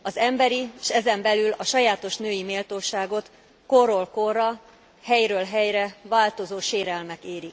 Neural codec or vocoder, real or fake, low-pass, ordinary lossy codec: none; real; none; none